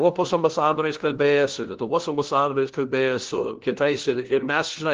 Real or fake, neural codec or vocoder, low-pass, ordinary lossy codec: fake; codec, 16 kHz, 1 kbps, FunCodec, trained on LibriTTS, 50 frames a second; 7.2 kHz; Opus, 16 kbps